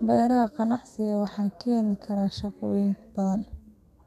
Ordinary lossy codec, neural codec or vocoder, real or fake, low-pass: none; codec, 32 kHz, 1.9 kbps, SNAC; fake; 14.4 kHz